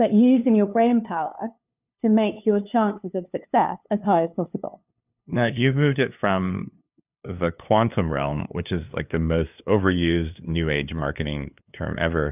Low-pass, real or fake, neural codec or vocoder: 3.6 kHz; fake; codec, 16 kHz, 2 kbps, FunCodec, trained on LibriTTS, 25 frames a second